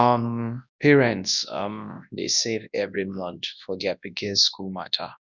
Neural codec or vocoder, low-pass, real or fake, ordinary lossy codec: codec, 24 kHz, 0.9 kbps, WavTokenizer, large speech release; 7.2 kHz; fake; none